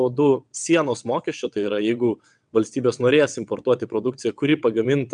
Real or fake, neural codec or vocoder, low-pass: fake; vocoder, 22.05 kHz, 80 mel bands, WaveNeXt; 9.9 kHz